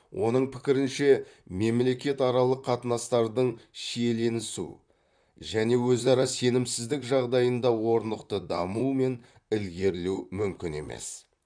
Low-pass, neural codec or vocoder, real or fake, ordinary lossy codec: 9.9 kHz; vocoder, 44.1 kHz, 128 mel bands, Pupu-Vocoder; fake; none